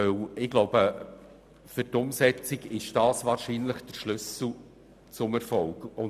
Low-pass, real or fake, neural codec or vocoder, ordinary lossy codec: 14.4 kHz; real; none; none